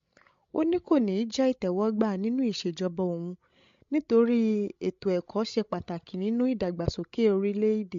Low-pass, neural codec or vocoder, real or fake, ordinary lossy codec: 7.2 kHz; codec, 16 kHz, 16 kbps, FreqCodec, larger model; fake; MP3, 48 kbps